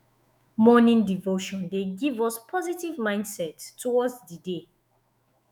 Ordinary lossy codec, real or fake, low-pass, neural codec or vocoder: none; fake; 19.8 kHz; autoencoder, 48 kHz, 128 numbers a frame, DAC-VAE, trained on Japanese speech